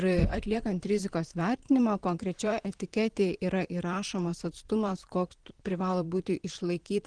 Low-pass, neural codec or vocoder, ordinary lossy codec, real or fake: 9.9 kHz; vocoder, 44.1 kHz, 128 mel bands, Pupu-Vocoder; Opus, 16 kbps; fake